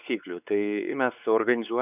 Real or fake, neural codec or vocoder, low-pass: fake; codec, 16 kHz, 4 kbps, X-Codec, WavLM features, trained on Multilingual LibriSpeech; 3.6 kHz